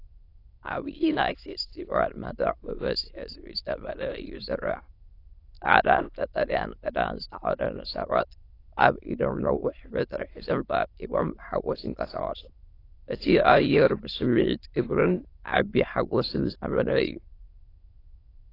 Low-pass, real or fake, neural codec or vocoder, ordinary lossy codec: 5.4 kHz; fake; autoencoder, 22.05 kHz, a latent of 192 numbers a frame, VITS, trained on many speakers; AAC, 32 kbps